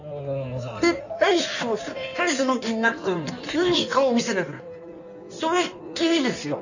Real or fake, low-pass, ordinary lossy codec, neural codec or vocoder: fake; 7.2 kHz; none; codec, 16 kHz in and 24 kHz out, 1.1 kbps, FireRedTTS-2 codec